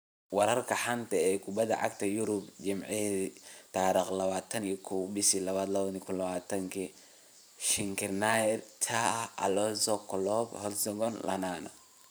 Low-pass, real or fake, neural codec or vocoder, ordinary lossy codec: none; fake; vocoder, 44.1 kHz, 128 mel bands every 512 samples, BigVGAN v2; none